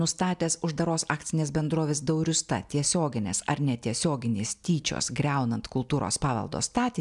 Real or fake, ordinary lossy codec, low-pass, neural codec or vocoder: real; MP3, 96 kbps; 10.8 kHz; none